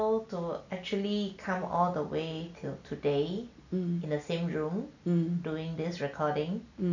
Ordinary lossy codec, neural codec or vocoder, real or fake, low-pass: none; none; real; 7.2 kHz